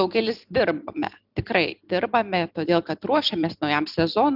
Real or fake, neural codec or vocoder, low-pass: real; none; 5.4 kHz